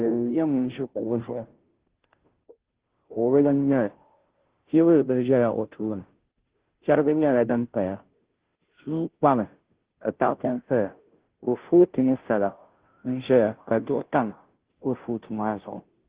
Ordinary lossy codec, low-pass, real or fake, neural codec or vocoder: Opus, 16 kbps; 3.6 kHz; fake; codec, 16 kHz, 0.5 kbps, FunCodec, trained on Chinese and English, 25 frames a second